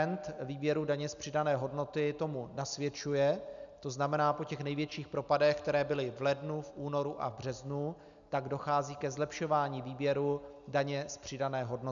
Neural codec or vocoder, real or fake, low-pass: none; real; 7.2 kHz